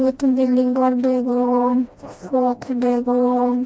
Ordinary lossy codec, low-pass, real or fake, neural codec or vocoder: none; none; fake; codec, 16 kHz, 1 kbps, FreqCodec, smaller model